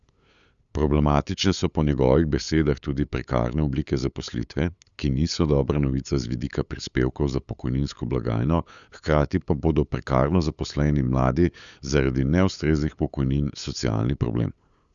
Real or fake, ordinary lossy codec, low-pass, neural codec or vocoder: fake; Opus, 64 kbps; 7.2 kHz; codec, 16 kHz, 8 kbps, FunCodec, trained on LibriTTS, 25 frames a second